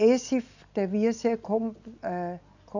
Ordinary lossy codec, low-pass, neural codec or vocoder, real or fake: none; 7.2 kHz; none; real